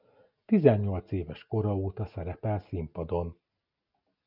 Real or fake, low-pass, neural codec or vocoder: real; 5.4 kHz; none